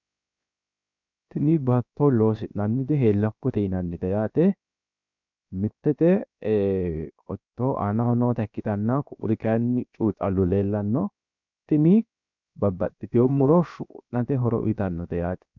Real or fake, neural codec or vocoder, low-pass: fake; codec, 16 kHz, 0.7 kbps, FocalCodec; 7.2 kHz